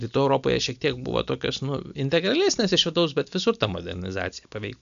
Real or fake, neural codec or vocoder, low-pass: real; none; 7.2 kHz